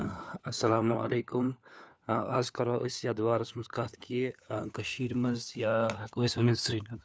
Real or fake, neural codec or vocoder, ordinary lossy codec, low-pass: fake; codec, 16 kHz, 4 kbps, FunCodec, trained on LibriTTS, 50 frames a second; none; none